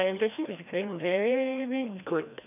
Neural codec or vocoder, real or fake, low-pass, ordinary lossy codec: codec, 16 kHz, 1 kbps, FreqCodec, larger model; fake; 3.6 kHz; none